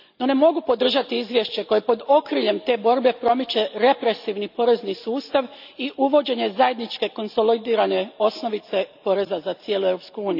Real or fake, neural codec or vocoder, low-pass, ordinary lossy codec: real; none; 5.4 kHz; none